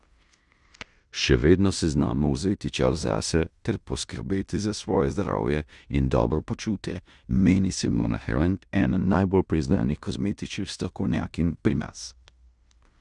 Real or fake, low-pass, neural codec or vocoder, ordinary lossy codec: fake; 10.8 kHz; codec, 16 kHz in and 24 kHz out, 0.9 kbps, LongCat-Audio-Codec, fine tuned four codebook decoder; Opus, 64 kbps